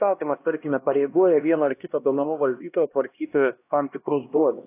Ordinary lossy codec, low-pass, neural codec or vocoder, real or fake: AAC, 24 kbps; 3.6 kHz; codec, 16 kHz, 1 kbps, X-Codec, HuBERT features, trained on LibriSpeech; fake